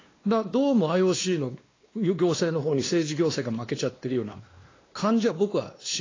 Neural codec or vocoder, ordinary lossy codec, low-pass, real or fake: codec, 16 kHz, 2 kbps, X-Codec, WavLM features, trained on Multilingual LibriSpeech; AAC, 32 kbps; 7.2 kHz; fake